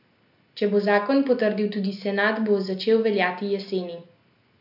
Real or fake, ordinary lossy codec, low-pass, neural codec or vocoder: real; none; 5.4 kHz; none